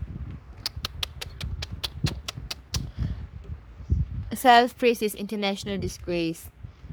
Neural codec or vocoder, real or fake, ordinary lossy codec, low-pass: codec, 44.1 kHz, 3.4 kbps, Pupu-Codec; fake; none; none